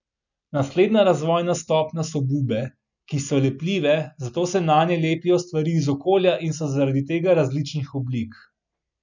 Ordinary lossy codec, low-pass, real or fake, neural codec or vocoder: none; 7.2 kHz; real; none